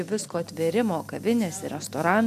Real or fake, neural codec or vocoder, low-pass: real; none; 14.4 kHz